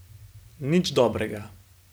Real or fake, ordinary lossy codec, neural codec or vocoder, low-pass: real; none; none; none